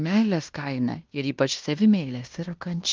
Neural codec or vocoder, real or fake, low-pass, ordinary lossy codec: codec, 16 kHz in and 24 kHz out, 0.9 kbps, LongCat-Audio-Codec, fine tuned four codebook decoder; fake; 7.2 kHz; Opus, 24 kbps